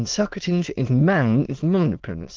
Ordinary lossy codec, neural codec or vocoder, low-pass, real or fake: Opus, 16 kbps; autoencoder, 22.05 kHz, a latent of 192 numbers a frame, VITS, trained on many speakers; 7.2 kHz; fake